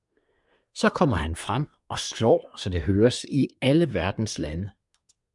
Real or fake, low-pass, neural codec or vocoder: fake; 10.8 kHz; codec, 24 kHz, 1 kbps, SNAC